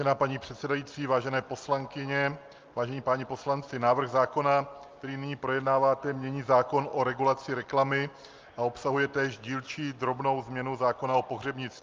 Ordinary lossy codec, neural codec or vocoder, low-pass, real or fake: Opus, 32 kbps; none; 7.2 kHz; real